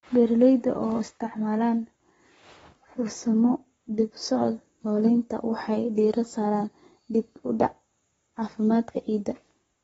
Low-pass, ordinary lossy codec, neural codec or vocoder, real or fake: 19.8 kHz; AAC, 24 kbps; codec, 44.1 kHz, 7.8 kbps, Pupu-Codec; fake